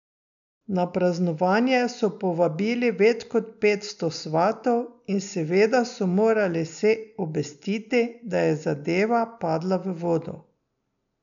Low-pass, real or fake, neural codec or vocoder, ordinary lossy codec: 7.2 kHz; real; none; none